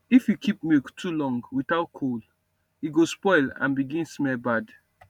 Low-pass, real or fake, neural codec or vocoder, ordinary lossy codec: 19.8 kHz; fake; vocoder, 44.1 kHz, 128 mel bands every 512 samples, BigVGAN v2; none